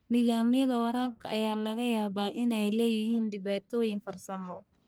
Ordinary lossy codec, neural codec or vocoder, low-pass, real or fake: none; codec, 44.1 kHz, 1.7 kbps, Pupu-Codec; none; fake